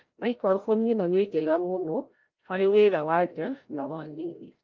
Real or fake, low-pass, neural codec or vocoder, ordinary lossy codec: fake; 7.2 kHz; codec, 16 kHz, 0.5 kbps, FreqCodec, larger model; Opus, 24 kbps